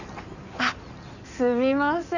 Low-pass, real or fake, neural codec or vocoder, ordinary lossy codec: 7.2 kHz; fake; codec, 16 kHz in and 24 kHz out, 2.2 kbps, FireRedTTS-2 codec; none